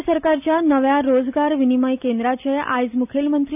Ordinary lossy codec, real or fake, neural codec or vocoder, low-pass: none; real; none; 3.6 kHz